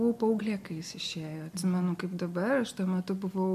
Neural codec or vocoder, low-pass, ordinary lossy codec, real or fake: none; 14.4 kHz; MP3, 64 kbps; real